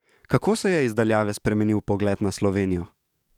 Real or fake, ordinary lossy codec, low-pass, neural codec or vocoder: fake; none; 19.8 kHz; codec, 44.1 kHz, 7.8 kbps, DAC